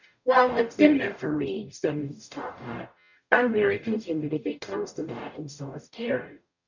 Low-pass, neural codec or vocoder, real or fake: 7.2 kHz; codec, 44.1 kHz, 0.9 kbps, DAC; fake